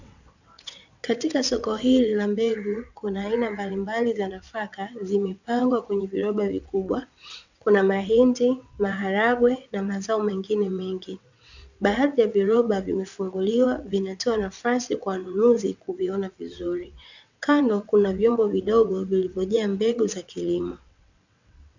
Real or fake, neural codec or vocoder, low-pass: fake; vocoder, 22.05 kHz, 80 mel bands, WaveNeXt; 7.2 kHz